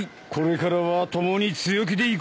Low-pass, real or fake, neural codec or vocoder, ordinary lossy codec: none; real; none; none